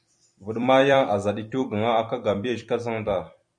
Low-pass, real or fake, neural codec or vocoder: 9.9 kHz; fake; vocoder, 44.1 kHz, 128 mel bands every 256 samples, BigVGAN v2